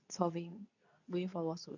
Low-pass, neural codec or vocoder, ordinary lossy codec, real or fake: 7.2 kHz; codec, 24 kHz, 0.9 kbps, WavTokenizer, medium speech release version 2; none; fake